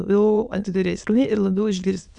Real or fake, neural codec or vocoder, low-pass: fake; autoencoder, 22.05 kHz, a latent of 192 numbers a frame, VITS, trained on many speakers; 9.9 kHz